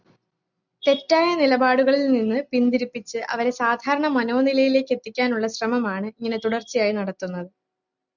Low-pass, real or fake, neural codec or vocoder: 7.2 kHz; real; none